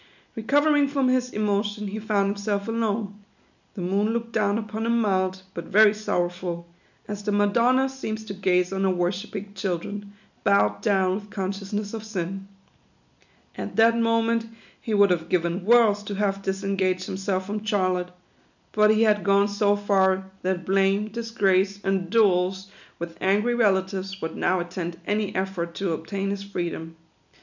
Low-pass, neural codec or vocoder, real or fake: 7.2 kHz; none; real